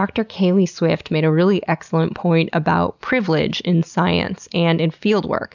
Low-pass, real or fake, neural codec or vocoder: 7.2 kHz; real; none